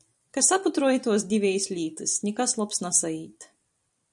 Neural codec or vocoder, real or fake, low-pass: vocoder, 44.1 kHz, 128 mel bands every 256 samples, BigVGAN v2; fake; 10.8 kHz